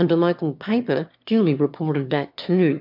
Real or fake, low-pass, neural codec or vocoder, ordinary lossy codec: fake; 5.4 kHz; autoencoder, 22.05 kHz, a latent of 192 numbers a frame, VITS, trained on one speaker; MP3, 48 kbps